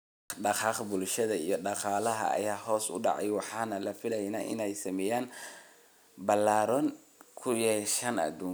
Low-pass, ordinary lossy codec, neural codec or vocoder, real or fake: none; none; none; real